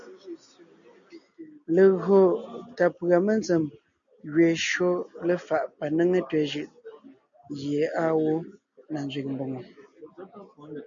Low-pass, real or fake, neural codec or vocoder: 7.2 kHz; real; none